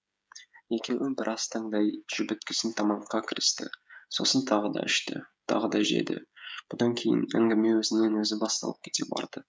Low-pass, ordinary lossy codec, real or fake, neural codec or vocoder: none; none; fake; codec, 16 kHz, 16 kbps, FreqCodec, smaller model